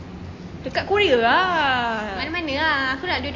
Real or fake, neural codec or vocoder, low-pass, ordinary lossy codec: real; none; 7.2 kHz; AAC, 32 kbps